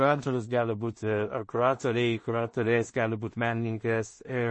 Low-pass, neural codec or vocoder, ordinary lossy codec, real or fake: 10.8 kHz; codec, 16 kHz in and 24 kHz out, 0.4 kbps, LongCat-Audio-Codec, two codebook decoder; MP3, 32 kbps; fake